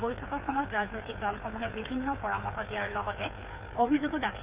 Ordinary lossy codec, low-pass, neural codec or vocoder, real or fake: Opus, 64 kbps; 3.6 kHz; codec, 16 kHz, 4 kbps, FreqCodec, smaller model; fake